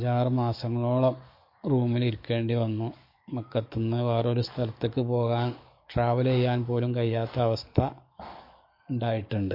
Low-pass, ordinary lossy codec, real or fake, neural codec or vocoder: 5.4 kHz; MP3, 32 kbps; fake; codec, 44.1 kHz, 7.8 kbps, DAC